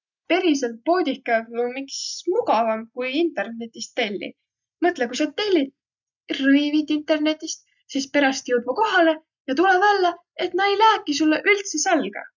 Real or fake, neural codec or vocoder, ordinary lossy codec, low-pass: real; none; none; 7.2 kHz